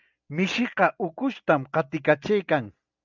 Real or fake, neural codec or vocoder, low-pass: real; none; 7.2 kHz